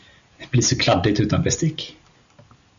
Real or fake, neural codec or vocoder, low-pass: real; none; 7.2 kHz